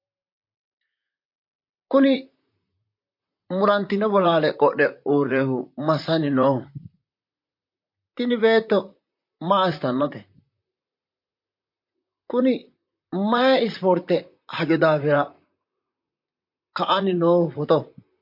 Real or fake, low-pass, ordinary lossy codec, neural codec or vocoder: fake; 5.4 kHz; MP3, 32 kbps; vocoder, 44.1 kHz, 128 mel bands, Pupu-Vocoder